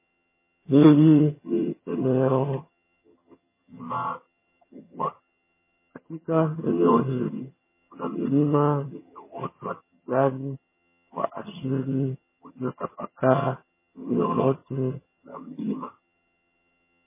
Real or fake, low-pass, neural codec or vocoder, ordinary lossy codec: fake; 3.6 kHz; vocoder, 22.05 kHz, 80 mel bands, HiFi-GAN; MP3, 16 kbps